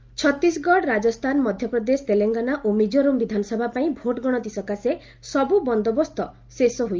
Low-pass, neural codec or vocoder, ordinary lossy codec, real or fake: 7.2 kHz; none; Opus, 24 kbps; real